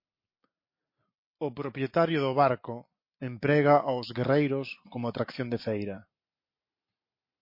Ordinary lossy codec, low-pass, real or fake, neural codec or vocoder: MP3, 32 kbps; 5.4 kHz; real; none